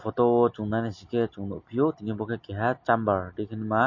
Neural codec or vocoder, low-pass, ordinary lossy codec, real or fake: none; 7.2 kHz; MP3, 32 kbps; real